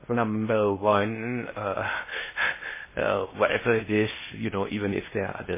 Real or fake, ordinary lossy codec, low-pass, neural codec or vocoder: fake; MP3, 16 kbps; 3.6 kHz; codec, 16 kHz in and 24 kHz out, 0.6 kbps, FocalCodec, streaming, 2048 codes